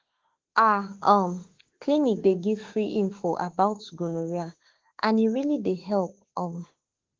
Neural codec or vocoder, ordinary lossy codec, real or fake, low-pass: autoencoder, 48 kHz, 32 numbers a frame, DAC-VAE, trained on Japanese speech; Opus, 16 kbps; fake; 7.2 kHz